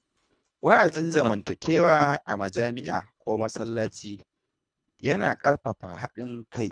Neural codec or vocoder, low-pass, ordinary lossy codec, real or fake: codec, 24 kHz, 1.5 kbps, HILCodec; 9.9 kHz; none; fake